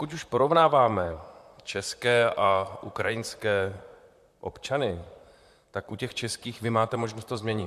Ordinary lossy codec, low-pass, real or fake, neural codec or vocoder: MP3, 96 kbps; 14.4 kHz; fake; vocoder, 44.1 kHz, 128 mel bands, Pupu-Vocoder